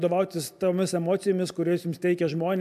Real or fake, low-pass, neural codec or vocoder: real; 14.4 kHz; none